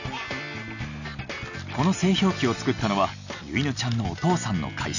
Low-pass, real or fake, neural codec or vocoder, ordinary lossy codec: 7.2 kHz; real; none; none